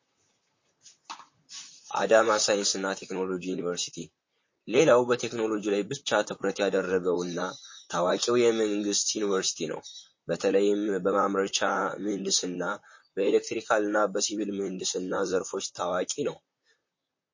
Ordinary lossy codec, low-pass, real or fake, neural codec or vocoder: MP3, 32 kbps; 7.2 kHz; fake; vocoder, 44.1 kHz, 128 mel bands, Pupu-Vocoder